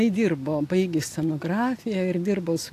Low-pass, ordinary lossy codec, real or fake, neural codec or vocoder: 14.4 kHz; AAC, 64 kbps; real; none